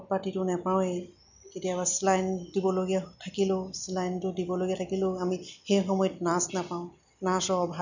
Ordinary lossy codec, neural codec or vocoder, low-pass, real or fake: none; none; 7.2 kHz; real